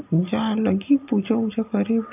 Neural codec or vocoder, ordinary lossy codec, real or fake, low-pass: none; none; real; 3.6 kHz